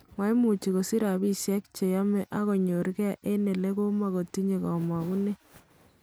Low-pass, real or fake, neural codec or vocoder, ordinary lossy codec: none; real; none; none